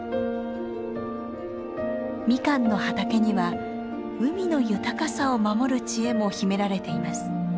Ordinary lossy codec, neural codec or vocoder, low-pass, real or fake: none; none; none; real